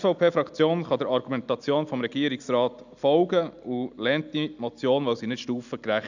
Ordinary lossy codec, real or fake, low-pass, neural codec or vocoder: none; real; 7.2 kHz; none